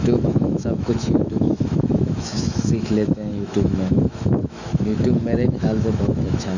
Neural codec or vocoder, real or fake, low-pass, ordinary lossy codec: autoencoder, 48 kHz, 128 numbers a frame, DAC-VAE, trained on Japanese speech; fake; 7.2 kHz; none